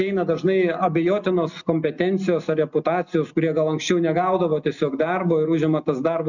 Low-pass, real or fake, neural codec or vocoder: 7.2 kHz; real; none